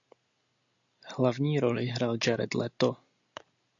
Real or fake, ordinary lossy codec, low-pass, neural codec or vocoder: real; AAC, 64 kbps; 7.2 kHz; none